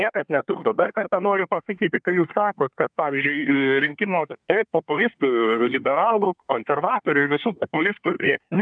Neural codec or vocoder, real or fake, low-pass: codec, 24 kHz, 1 kbps, SNAC; fake; 9.9 kHz